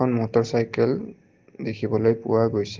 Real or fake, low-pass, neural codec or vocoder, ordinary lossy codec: real; 7.2 kHz; none; Opus, 16 kbps